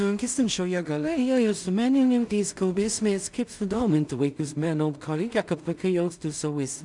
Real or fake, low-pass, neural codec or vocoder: fake; 10.8 kHz; codec, 16 kHz in and 24 kHz out, 0.4 kbps, LongCat-Audio-Codec, two codebook decoder